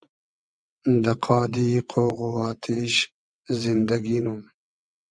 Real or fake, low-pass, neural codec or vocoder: fake; 9.9 kHz; vocoder, 22.05 kHz, 80 mel bands, WaveNeXt